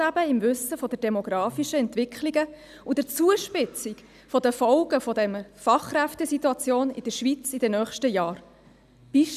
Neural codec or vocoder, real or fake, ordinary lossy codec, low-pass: none; real; none; 14.4 kHz